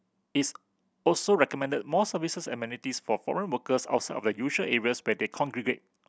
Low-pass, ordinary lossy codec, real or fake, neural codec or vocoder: none; none; real; none